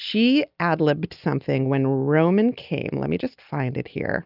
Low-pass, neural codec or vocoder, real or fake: 5.4 kHz; none; real